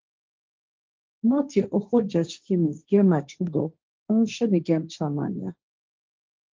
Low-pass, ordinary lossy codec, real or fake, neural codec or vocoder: 7.2 kHz; Opus, 32 kbps; fake; codec, 16 kHz, 1.1 kbps, Voila-Tokenizer